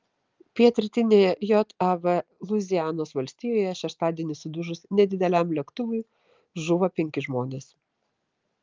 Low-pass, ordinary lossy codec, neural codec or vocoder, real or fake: 7.2 kHz; Opus, 24 kbps; none; real